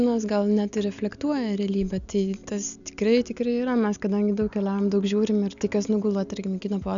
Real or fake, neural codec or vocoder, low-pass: real; none; 7.2 kHz